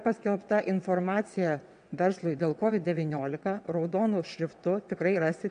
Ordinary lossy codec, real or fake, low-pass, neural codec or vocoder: MP3, 64 kbps; fake; 9.9 kHz; vocoder, 22.05 kHz, 80 mel bands, WaveNeXt